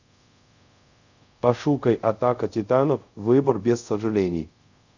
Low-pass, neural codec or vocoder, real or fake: 7.2 kHz; codec, 24 kHz, 0.5 kbps, DualCodec; fake